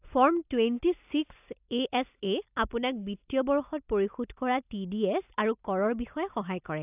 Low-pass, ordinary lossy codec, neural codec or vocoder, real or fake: 3.6 kHz; AAC, 32 kbps; none; real